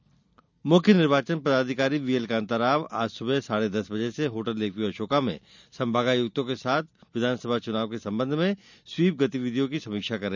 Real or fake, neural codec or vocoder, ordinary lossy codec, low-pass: real; none; none; 7.2 kHz